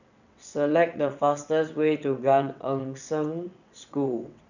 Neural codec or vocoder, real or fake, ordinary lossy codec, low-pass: vocoder, 22.05 kHz, 80 mel bands, WaveNeXt; fake; none; 7.2 kHz